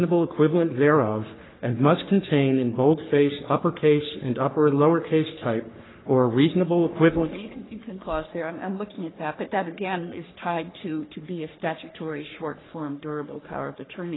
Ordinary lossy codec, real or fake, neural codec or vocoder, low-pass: AAC, 16 kbps; fake; codec, 44.1 kHz, 3.4 kbps, Pupu-Codec; 7.2 kHz